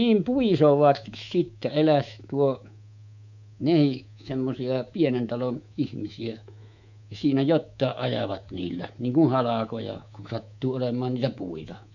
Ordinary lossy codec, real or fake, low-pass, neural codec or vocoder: none; fake; 7.2 kHz; codec, 24 kHz, 3.1 kbps, DualCodec